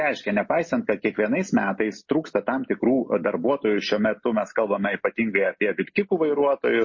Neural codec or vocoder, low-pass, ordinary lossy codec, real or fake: none; 7.2 kHz; MP3, 32 kbps; real